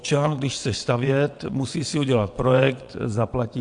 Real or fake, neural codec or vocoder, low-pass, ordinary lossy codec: fake; vocoder, 22.05 kHz, 80 mel bands, WaveNeXt; 9.9 kHz; MP3, 64 kbps